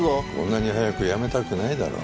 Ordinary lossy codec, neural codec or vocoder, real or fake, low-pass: none; none; real; none